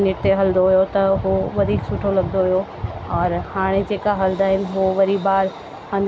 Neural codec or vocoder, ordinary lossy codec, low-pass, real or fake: none; none; none; real